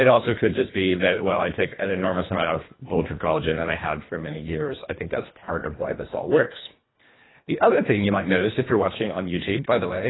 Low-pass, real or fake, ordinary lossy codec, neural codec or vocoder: 7.2 kHz; fake; AAC, 16 kbps; codec, 24 kHz, 1.5 kbps, HILCodec